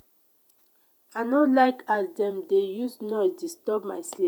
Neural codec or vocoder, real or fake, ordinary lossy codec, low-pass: vocoder, 48 kHz, 128 mel bands, Vocos; fake; none; none